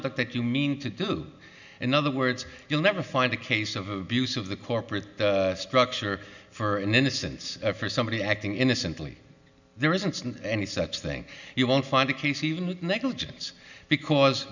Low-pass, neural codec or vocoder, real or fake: 7.2 kHz; none; real